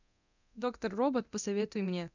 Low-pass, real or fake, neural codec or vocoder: 7.2 kHz; fake; codec, 24 kHz, 0.9 kbps, DualCodec